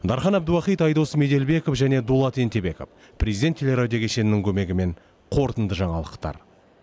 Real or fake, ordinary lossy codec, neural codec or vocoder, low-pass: real; none; none; none